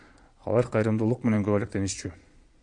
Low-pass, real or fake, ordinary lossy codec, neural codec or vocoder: 9.9 kHz; fake; MP3, 64 kbps; vocoder, 22.05 kHz, 80 mel bands, Vocos